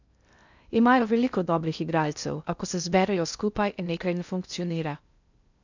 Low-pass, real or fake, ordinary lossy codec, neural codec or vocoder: 7.2 kHz; fake; none; codec, 16 kHz in and 24 kHz out, 0.6 kbps, FocalCodec, streaming, 2048 codes